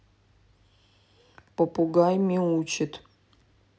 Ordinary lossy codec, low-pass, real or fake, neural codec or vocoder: none; none; real; none